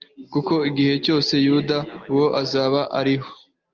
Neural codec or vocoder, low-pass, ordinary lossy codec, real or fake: none; 7.2 kHz; Opus, 24 kbps; real